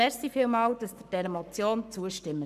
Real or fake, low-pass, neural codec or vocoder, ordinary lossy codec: fake; 14.4 kHz; codec, 44.1 kHz, 7.8 kbps, Pupu-Codec; none